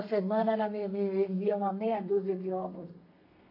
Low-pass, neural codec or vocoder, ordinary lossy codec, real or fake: 5.4 kHz; codec, 32 kHz, 1.9 kbps, SNAC; MP3, 32 kbps; fake